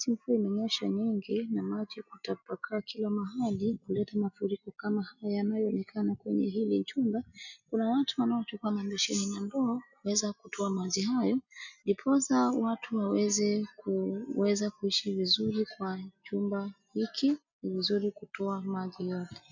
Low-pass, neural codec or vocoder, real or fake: 7.2 kHz; none; real